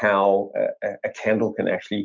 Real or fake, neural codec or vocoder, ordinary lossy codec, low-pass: real; none; MP3, 64 kbps; 7.2 kHz